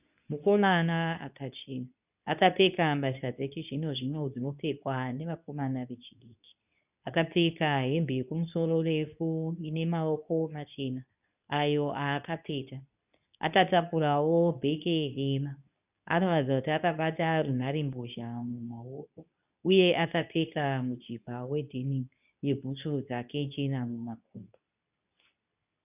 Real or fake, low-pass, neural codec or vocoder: fake; 3.6 kHz; codec, 24 kHz, 0.9 kbps, WavTokenizer, medium speech release version 2